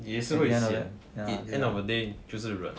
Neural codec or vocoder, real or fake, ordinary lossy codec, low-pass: none; real; none; none